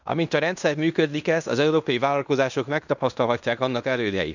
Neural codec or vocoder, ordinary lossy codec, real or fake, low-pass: codec, 16 kHz in and 24 kHz out, 0.9 kbps, LongCat-Audio-Codec, fine tuned four codebook decoder; none; fake; 7.2 kHz